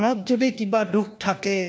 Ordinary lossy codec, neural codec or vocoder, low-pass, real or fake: none; codec, 16 kHz, 1 kbps, FunCodec, trained on LibriTTS, 50 frames a second; none; fake